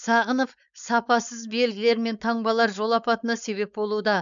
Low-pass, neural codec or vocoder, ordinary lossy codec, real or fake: 7.2 kHz; codec, 16 kHz, 4.8 kbps, FACodec; none; fake